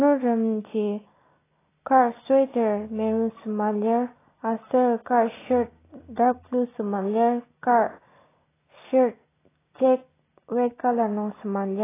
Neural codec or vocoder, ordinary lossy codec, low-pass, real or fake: none; AAC, 16 kbps; 3.6 kHz; real